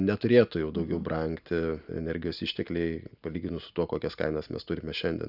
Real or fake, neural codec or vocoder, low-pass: real; none; 5.4 kHz